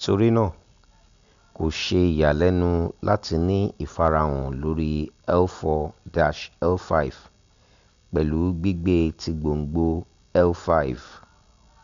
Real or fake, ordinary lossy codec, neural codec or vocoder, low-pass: real; Opus, 64 kbps; none; 7.2 kHz